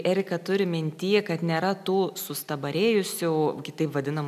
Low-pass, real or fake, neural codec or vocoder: 14.4 kHz; real; none